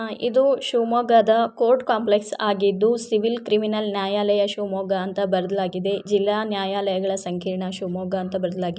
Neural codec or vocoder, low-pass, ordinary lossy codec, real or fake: none; none; none; real